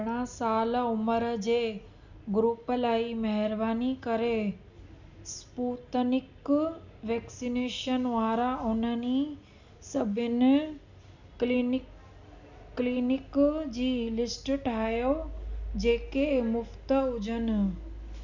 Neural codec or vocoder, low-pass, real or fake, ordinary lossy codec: none; 7.2 kHz; real; none